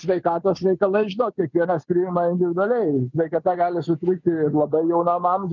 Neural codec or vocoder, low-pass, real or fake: none; 7.2 kHz; real